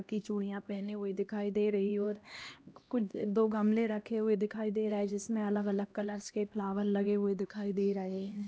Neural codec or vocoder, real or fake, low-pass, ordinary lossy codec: codec, 16 kHz, 1 kbps, X-Codec, HuBERT features, trained on LibriSpeech; fake; none; none